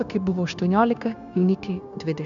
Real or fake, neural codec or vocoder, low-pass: fake; codec, 16 kHz, 0.9 kbps, LongCat-Audio-Codec; 7.2 kHz